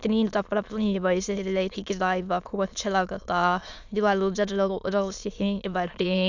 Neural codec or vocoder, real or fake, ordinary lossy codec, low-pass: autoencoder, 22.05 kHz, a latent of 192 numbers a frame, VITS, trained on many speakers; fake; none; 7.2 kHz